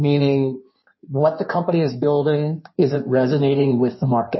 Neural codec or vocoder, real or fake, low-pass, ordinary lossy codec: codec, 16 kHz in and 24 kHz out, 1.1 kbps, FireRedTTS-2 codec; fake; 7.2 kHz; MP3, 24 kbps